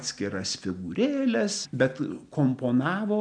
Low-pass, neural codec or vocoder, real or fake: 9.9 kHz; none; real